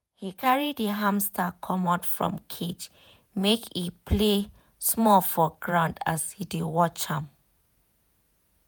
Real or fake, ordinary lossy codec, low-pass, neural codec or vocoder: fake; none; none; vocoder, 48 kHz, 128 mel bands, Vocos